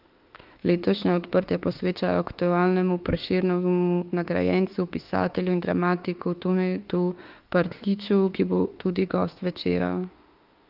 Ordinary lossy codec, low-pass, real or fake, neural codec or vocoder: Opus, 32 kbps; 5.4 kHz; fake; autoencoder, 48 kHz, 32 numbers a frame, DAC-VAE, trained on Japanese speech